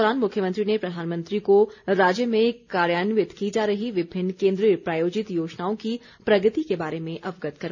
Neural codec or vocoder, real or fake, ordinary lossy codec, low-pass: none; real; none; 7.2 kHz